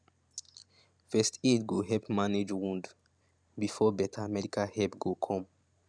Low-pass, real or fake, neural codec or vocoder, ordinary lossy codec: 9.9 kHz; fake; vocoder, 44.1 kHz, 128 mel bands every 512 samples, BigVGAN v2; none